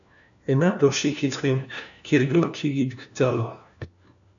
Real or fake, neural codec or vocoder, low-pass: fake; codec, 16 kHz, 1 kbps, FunCodec, trained on LibriTTS, 50 frames a second; 7.2 kHz